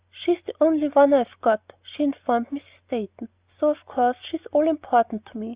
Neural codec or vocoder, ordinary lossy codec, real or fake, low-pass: none; Opus, 64 kbps; real; 3.6 kHz